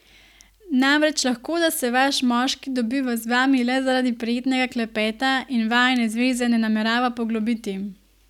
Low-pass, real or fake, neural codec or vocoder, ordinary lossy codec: 19.8 kHz; real; none; none